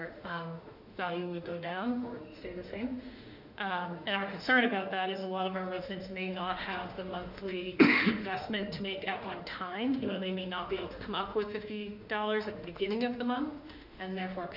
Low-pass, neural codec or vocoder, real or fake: 5.4 kHz; autoencoder, 48 kHz, 32 numbers a frame, DAC-VAE, trained on Japanese speech; fake